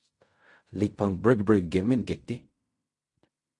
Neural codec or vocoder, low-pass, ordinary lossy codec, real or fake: codec, 16 kHz in and 24 kHz out, 0.4 kbps, LongCat-Audio-Codec, fine tuned four codebook decoder; 10.8 kHz; MP3, 48 kbps; fake